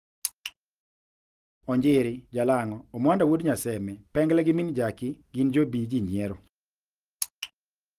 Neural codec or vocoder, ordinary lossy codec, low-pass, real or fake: none; Opus, 24 kbps; 14.4 kHz; real